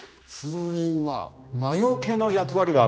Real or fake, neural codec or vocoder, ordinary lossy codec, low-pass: fake; codec, 16 kHz, 1 kbps, X-Codec, HuBERT features, trained on general audio; none; none